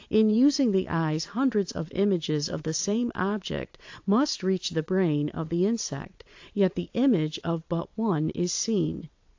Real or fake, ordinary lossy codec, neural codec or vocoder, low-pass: fake; AAC, 48 kbps; vocoder, 22.05 kHz, 80 mel bands, Vocos; 7.2 kHz